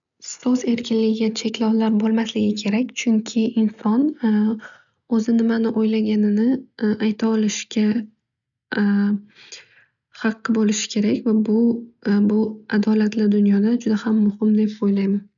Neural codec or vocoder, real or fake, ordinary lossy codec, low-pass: none; real; none; 7.2 kHz